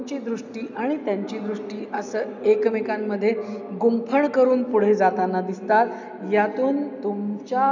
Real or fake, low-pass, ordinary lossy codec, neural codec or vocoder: real; 7.2 kHz; none; none